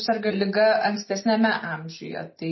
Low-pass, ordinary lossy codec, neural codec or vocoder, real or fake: 7.2 kHz; MP3, 24 kbps; none; real